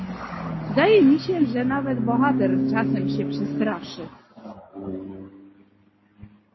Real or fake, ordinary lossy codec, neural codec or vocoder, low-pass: real; MP3, 24 kbps; none; 7.2 kHz